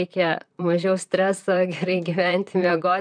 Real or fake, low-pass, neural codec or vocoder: fake; 9.9 kHz; vocoder, 44.1 kHz, 128 mel bands, Pupu-Vocoder